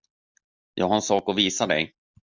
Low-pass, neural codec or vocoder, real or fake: 7.2 kHz; none; real